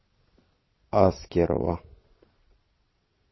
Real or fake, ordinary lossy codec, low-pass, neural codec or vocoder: fake; MP3, 24 kbps; 7.2 kHz; vocoder, 22.05 kHz, 80 mel bands, Vocos